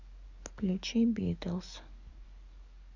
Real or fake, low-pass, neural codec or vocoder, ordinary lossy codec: fake; 7.2 kHz; codec, 44.1 kHz, 7.8 kbps, DAC; none